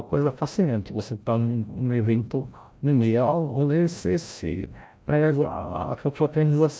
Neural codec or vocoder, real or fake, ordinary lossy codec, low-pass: codec, 16 kHz, 0.5 kbps, FreqCodec, larger model; fake; none; none